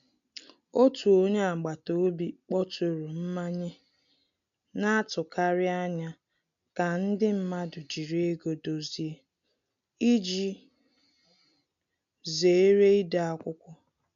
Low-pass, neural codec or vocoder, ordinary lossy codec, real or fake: 7.2 kHz; none; none; real